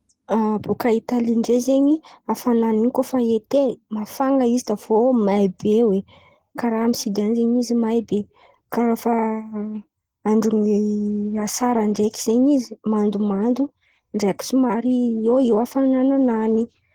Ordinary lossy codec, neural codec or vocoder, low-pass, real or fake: Opus, 16 kbps; none; 19.8 kHz; real